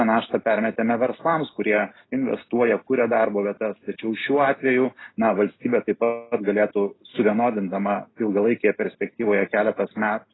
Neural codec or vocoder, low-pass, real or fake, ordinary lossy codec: none; 7.2 kHz; real; AAC, 16 kbps